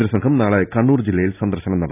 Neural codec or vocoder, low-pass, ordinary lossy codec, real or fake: none; 3.6 kHz; none; real